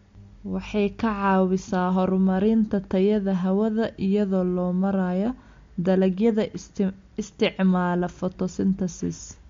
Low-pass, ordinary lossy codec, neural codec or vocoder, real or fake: 7.2 kHz; MP3, 48 kbps; none; real